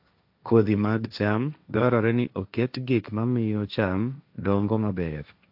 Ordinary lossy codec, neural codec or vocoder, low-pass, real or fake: AAC, 48 kbps; codec, 16 kHz, 1.1 kbps, Voila-Tokenizer; 5.4 kHz; fake